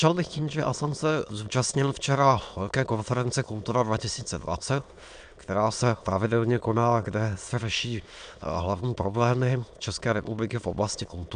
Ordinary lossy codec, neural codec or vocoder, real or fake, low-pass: AAC, 96 kbps; autoencoder, 22.05 kHz, a latent of 192 numbers a frame, VITS, trained on many speakers; fake; 9.9 kHz